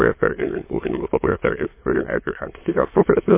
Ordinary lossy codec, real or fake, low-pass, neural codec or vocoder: MP3, 24 kbps; fake; 3.6 kHz; autoencoder, 22.05 kHz, a latent of 192 numbers a frame, VITS, trained on many speakers